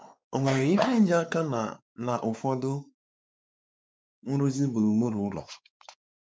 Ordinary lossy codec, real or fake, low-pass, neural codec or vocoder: none; fake; none; codec, 16 kHz, 4 kbps, X-Codec, WavLM features, trained on Multilingual LibriSpeech